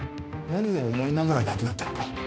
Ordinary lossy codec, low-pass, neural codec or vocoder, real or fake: none; none; codec, 16 kHz, 1 kbps, X-Codec, HuBERT features, trained on balanced general audio; fake